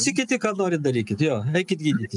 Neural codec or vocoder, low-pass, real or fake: none; 10.8 kHz; real